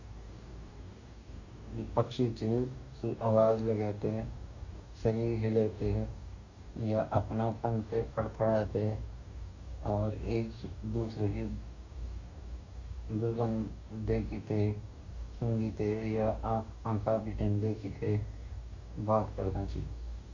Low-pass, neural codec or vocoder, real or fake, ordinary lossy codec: 7.2 kHz; codec, 44.1 kHz, 2.6 kbps, DAC; fake; none